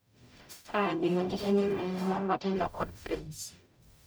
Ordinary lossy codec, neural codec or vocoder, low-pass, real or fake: none; codec, 44.1 kHz, 0.9 kbps, DAC; none; fake